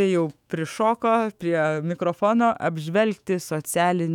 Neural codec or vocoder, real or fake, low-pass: autoencoder, 48 kHz, 128 numbers a frame, DAC-VAE, trained on Japanese speech; fake; 19.8 kHz